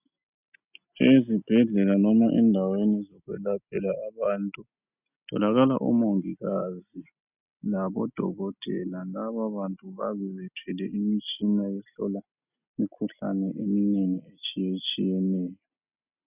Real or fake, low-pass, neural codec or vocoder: real; 3.6 kHz; none